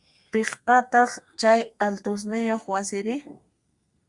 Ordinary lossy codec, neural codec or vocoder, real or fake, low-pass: Opus, 64 kbps; codec, 32 kHz, 1.9 kbps, SNAC; fake; 10.8 kHz